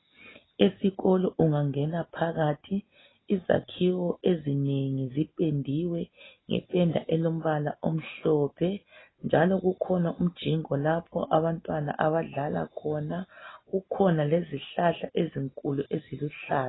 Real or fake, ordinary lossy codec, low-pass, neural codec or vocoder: real; AAC, 16 kbps; 7.2 kHz; none